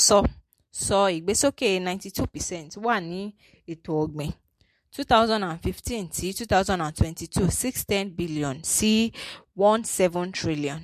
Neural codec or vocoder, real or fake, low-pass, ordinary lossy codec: none; real; 14.4 kHz; MP3, 64 kbps